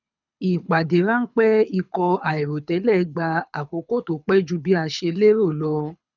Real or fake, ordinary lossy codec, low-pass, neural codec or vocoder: fake; none; 7.2 kHz; codec, 24 kHz, 6 kbps, HILCodec